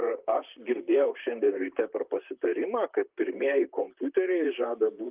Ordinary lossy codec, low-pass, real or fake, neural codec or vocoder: Opus, 24 kbps; 3.6 kHz; fake; vocoder, 44.1 kHz, 128 mel bands, Pupu-Vocoder